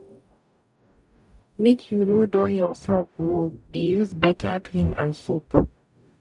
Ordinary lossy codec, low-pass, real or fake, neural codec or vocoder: none; 10.8 kHz; fake; codec, 44.1 kHz, 0.9 kbps, DAC